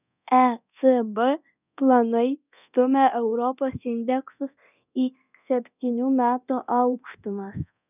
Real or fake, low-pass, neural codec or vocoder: fake; 3.6 kHz; codec, 24 kHz, 0.9 kbps, DualCodec